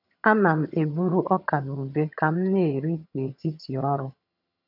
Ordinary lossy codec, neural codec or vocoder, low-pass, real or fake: none; vocoder, 22.05 kHz, 80 mel bands, HiFi-GAN; 5.4 kHz; fake